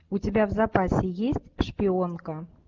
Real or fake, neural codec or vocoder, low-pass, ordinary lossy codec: real; none; 7.2 kHz; Opus, 32 kbps